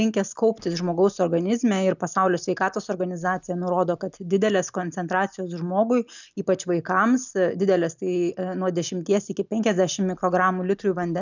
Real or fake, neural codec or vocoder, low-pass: real; none; 7.2 kHz